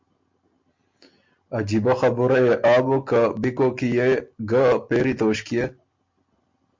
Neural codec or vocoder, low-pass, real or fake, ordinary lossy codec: none; 7.2 kHz; real; MP3, 48 kbps